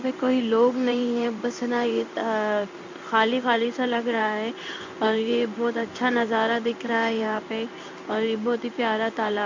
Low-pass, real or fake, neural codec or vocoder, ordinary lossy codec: 7.2 kHz; fake; codec, 16 kHz in and 24 kHz out, 1 kbps, XY-Tokenizer; MP3, 64 kbps